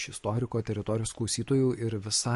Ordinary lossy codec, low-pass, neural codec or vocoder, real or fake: MP3, 48 kbps; 14.4 kHz; none; real